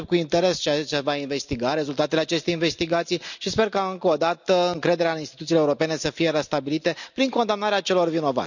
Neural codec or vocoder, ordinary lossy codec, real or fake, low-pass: none; none; real; 7.2 kHz